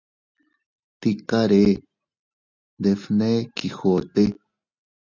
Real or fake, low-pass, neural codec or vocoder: real; 7.2 kHz; none